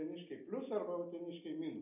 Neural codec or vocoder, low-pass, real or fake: none; 3.6 kHz; real